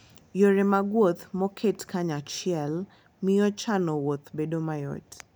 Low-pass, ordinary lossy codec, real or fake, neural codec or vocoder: none; none; real; none